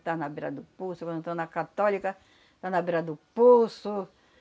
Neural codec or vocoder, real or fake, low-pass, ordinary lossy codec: none; real; none; none